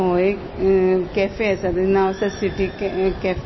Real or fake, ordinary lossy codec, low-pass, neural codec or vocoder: real; MP3, 24 kbps; 7.2 kHz; none